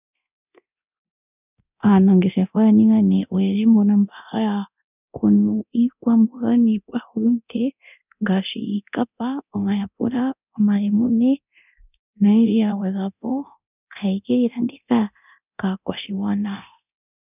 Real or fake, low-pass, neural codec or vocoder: fake; 3.6 kHz; codec, 24 kHz, 0.9 kbps, DualCodec